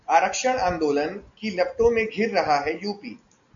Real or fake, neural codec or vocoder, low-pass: real; none; 7.2 kHz